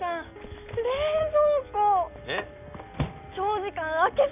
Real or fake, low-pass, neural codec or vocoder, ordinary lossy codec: real; 3.6 kHz; none; none